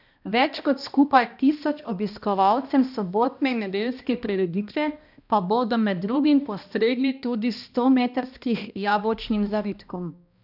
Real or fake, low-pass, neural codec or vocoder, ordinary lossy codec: fake; 5.4 kHz; codec, 16 kHz, 1 kbps, X-Codec, HuBERT features, trained on balanced general audio; none